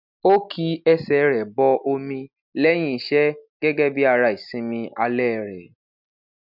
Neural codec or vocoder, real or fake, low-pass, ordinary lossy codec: none; real; 5.4 kHz; none